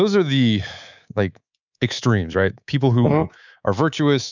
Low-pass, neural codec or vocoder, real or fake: 7.2 kHz; codec, 24 kHz, 3.1 kbps, DualCodec; fake